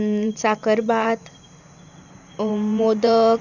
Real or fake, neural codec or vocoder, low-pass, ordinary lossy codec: fake; vocoder, 44.1 kHz, 128 mel bands every 512 samples, BigVGAN v2; 7.2 kHz; none